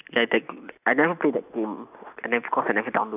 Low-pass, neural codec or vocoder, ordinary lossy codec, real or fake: 3.6 kHz; none; none; real